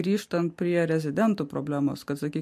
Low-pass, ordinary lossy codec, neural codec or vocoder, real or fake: 14.4 kHz; MP3, 64 kbps; none; real